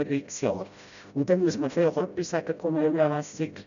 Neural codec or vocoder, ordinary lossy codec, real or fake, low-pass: codec, 16 kHz, 0.5 kbps, FreqCodec, smaller model; none; fake; 7.2 kHz